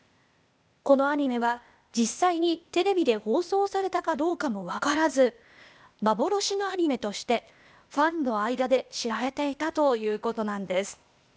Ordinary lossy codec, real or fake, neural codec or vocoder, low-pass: none; fake; codec, 16 kHz, 0.8 kbps, ZipCodec; none